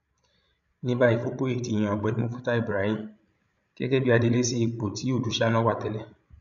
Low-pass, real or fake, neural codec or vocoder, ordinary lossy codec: 7.2 kHz; fake; codec, 16 kHz, 16 kbps, FreqCodec, larger model; none